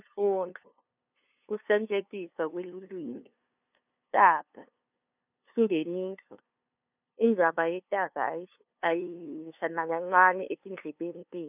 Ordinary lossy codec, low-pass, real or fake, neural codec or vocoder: none; 3.6 kHz; fake; codec, 16 kHz, 2 kbps, FunCodec, trained on LibriTTS, 25 frames a second